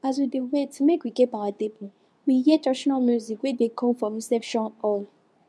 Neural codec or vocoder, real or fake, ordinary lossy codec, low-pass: codec, 24 kHz, 0.9 kbps, WavTokenizer, medium speech release version 2; fake; none; none